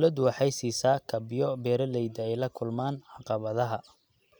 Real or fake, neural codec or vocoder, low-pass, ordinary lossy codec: real; none; none; none